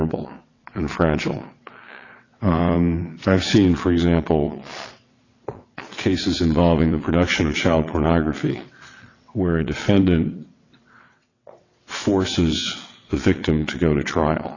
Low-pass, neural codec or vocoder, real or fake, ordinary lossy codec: 7.2 kHz; vocoder, 22.05 kHz, 80 mel bands, WaveNeXt; fake; AAC, 32 kbps